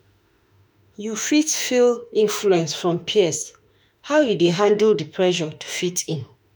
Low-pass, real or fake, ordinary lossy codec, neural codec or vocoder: none; fake; none; autoencoder, 48 kHz, 32 numbers a frame, DAC-VAE, trained on Japanese speech